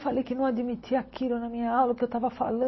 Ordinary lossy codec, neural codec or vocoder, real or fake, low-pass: MP3, 24 kbps; none; real; 7.2 kHz